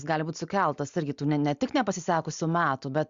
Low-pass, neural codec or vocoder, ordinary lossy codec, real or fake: 7.2 kHz; none; Opus, 64 kbps; real